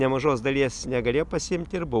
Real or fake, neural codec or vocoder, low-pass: real; none; 10.8 kHz